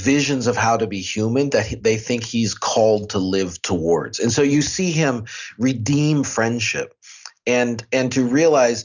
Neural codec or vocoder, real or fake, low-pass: none; real; 7.2 kHz